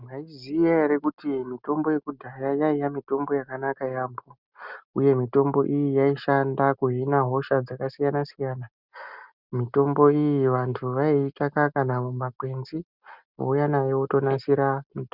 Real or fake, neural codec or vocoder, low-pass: real; none; 5.4 kHz